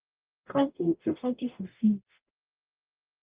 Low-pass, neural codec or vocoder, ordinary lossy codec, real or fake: 3.6 kHz; codec, 44.1 kHz, 0.9 kbps, DAC; Opus, 24 kbps; fake